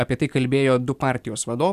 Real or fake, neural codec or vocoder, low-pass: real; none; 14.4 kHz